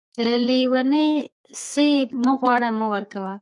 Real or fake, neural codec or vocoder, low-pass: fake; codec, 32 kHz, 1.9 kbps, SNAC; 10.8 kHz